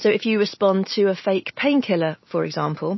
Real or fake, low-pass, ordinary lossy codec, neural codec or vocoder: real; 7.2 kHz; MP3, 24 kbps; none